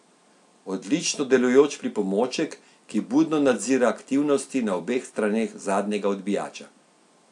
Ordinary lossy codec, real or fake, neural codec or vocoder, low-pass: AAC, 64 kbps; real; none; 10.8 kHz